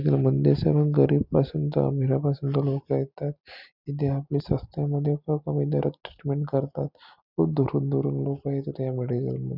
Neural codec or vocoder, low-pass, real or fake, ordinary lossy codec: none; 5.4 kHz; real; none